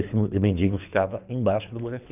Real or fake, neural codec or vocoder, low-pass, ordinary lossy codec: fake; codec, 24 kHz, 3 kbps, HILCodec; 3.6 kHz; none